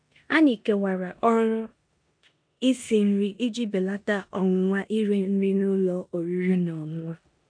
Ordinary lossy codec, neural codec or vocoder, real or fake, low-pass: AAC, 64 kbps; codec, 16 kHz in and 24 kHz out, 0.9 kbps, LongCat-Audio-Codec, four codebook decoder; fake; 9.9 kHz